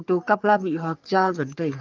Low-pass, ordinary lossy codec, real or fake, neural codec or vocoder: 7.2 kHz; Opus, 32 kbps; fake; codec, 44.1 kHz, 3.4 kbps, Pupu-Codec